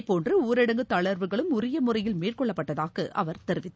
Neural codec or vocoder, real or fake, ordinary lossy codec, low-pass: none; real; none; none